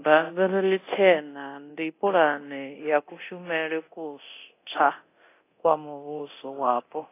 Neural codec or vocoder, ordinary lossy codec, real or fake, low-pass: codec, 24 kHz, 0.9 kbps, DualCodec; AAC, 24 kbps; fake; 3.6 kHz